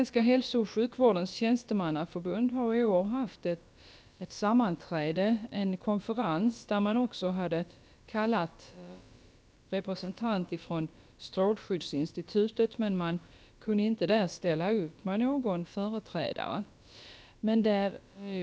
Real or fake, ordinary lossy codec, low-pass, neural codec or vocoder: fake; none; none; codec, 16 kHz, about 1 kbps, DyCAST, with the encoder's durations